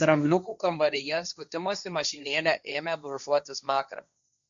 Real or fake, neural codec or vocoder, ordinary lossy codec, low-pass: fake; codec, 16 kHz, 1.1 kbps, Voila-Tokenizer; MP3, 96 kbps; 7.2 kHz